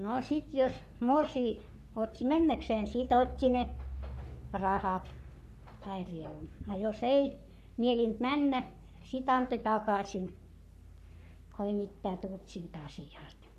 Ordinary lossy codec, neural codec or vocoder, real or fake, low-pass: none; codec, 44.1 kHz, 3.4 kbps, Pupu-Codec; fake; 14.4 kHz